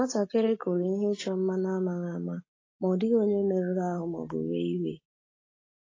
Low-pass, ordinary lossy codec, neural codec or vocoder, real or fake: 7.2 kHz; AAC, 32 kbps; none; real